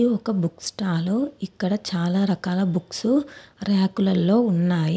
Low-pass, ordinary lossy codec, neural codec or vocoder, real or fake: none; none; none; real